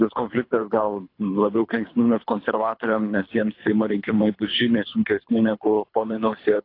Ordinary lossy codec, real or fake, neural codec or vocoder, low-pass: AAC, 32 kbps; fake; codec, 24 kHz, 3 kbps, HILCodec; 5.4 kHz